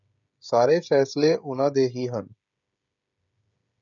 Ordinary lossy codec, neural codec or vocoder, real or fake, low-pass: MP3, 96 kbps; codec, 16 kHz, 16 kbps, FreqCodec, smaller model; fake; 7.2 kHz